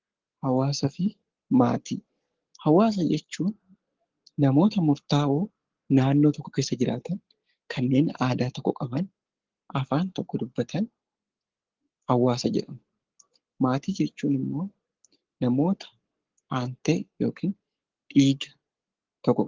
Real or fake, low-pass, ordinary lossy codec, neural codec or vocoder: fake; 7.2 kHz; Opus, 16 kbps; codec, 44.1 kHz, 7.8 kbps, Pupu-Codec